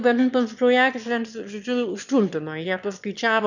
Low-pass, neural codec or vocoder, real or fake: 7.2 kHz; autoencoder, 22.05 kHz, a latent of 192 numbers a frame, VITS, trained on one speaker; fake